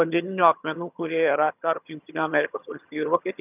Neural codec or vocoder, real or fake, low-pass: vocoder, 22.05 kHz, 80 mel bands, HiFi-GAN; fake; 3.6 kHz